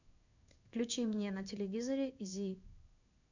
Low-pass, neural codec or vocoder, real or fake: 7.2 kHz; codec, 16 kHz in and 24 kHz out, 1 kbps, XY-Tokenizer; fake